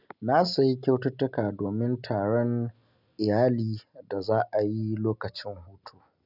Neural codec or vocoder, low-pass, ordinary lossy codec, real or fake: none; 5.4 kHz; none; real